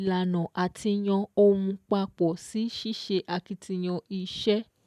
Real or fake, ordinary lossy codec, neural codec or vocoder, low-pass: real; none; none; 14.4 kHz